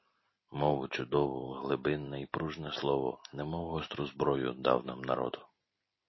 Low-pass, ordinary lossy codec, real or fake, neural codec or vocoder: 7.2 kHz; MP3, 24 kbps; real; none